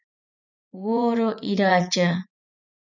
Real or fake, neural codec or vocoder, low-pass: fake; vocoder, 44.1 kHz, 80 mel bands, Vocos; 7.2 kHz